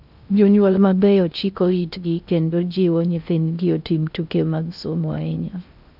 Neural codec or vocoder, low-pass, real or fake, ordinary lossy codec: codec, 16 kHz in and 24 kHz out, 0.6 kbps, FocalCodec, streaming, 2048 codes; 5.4 kHz; fake; none